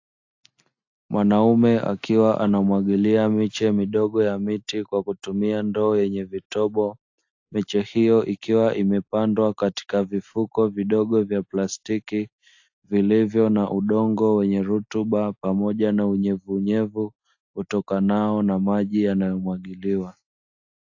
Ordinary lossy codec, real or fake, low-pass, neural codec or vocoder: AAC, 48 kbps; real; 7.2 kHz; none